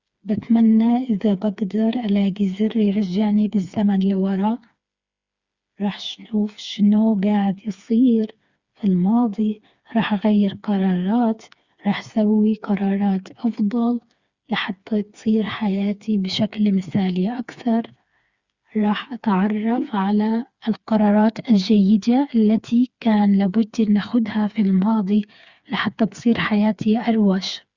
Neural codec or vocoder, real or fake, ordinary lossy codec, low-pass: codec, 16 kHz, 4 kbps, FreqCodec, smaller model; fake; Opus, 64 kbps; 7.2 kHz